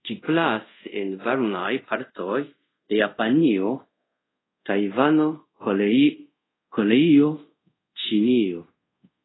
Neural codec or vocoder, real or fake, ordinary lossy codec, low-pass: codec, 24 kHz, 0.5 kbps, DualCodec; fake; AAC, 16 kbps; 7.2 kHz